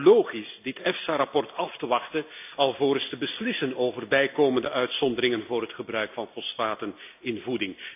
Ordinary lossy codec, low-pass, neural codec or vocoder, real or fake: AAC, 32 kbps; 3.6 kHz; none; real